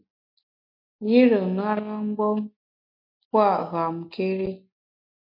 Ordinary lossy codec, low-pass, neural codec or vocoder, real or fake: MP3, 32 kbps; 5.4 kHz; none; real